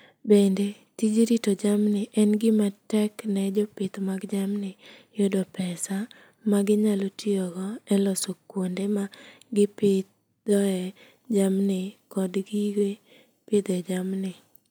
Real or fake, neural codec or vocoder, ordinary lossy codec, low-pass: real; none; none; none